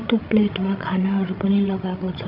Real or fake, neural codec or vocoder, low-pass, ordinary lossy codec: fake; codec, 16 kHz, 16 kbps, FreqCodec, larger model; 5.4 kHz; none